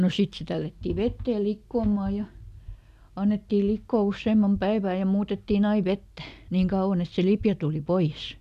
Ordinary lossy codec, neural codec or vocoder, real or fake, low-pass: none; none; real; 14.4 kHz